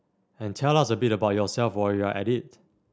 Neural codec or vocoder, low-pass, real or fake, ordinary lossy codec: none; none; real; none